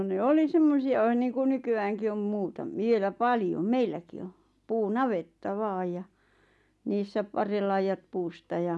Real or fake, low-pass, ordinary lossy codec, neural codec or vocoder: real; none; none; none